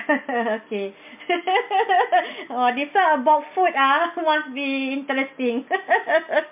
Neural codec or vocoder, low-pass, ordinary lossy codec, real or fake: none; 3.6 kHz; MP3, 32 kbps; real